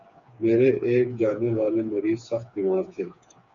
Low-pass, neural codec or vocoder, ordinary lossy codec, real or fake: 7.2 kHz; codec, 16 kHz, 4 kbps, FreqCodec, smaller model; MP3, 64 kbps; fake